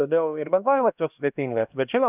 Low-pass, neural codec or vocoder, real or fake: 3.6 kHz; codec, 16 kHz, 1 kbps, X-Codec, HuBERT features, trained on LibriSpeech; fake